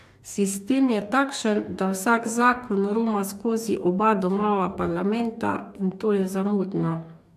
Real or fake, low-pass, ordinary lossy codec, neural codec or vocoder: fake; 14.4 kHz; AAC, 96 kbps; codec, 44.1 kHz, 2.6 kbps, DAC